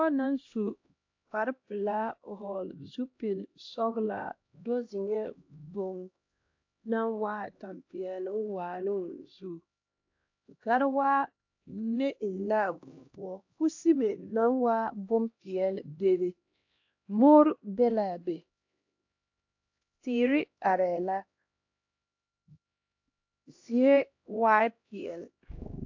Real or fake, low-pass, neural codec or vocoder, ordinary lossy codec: fake; 7.2 kHz; codec, 16 kHz, 1 kbps, X-Codec, HuBERT features, trained on LibriSpeech; AAC, 48 kbps